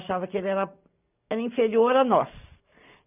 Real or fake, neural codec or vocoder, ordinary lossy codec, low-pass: real; none; none; 3.6 kHz